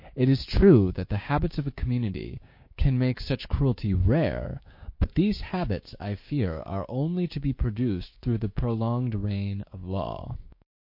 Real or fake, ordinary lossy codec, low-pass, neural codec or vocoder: fake; MP3, 32 kbps; 5.4 kHz; codec, 16 kHz, 6 kbps, DAC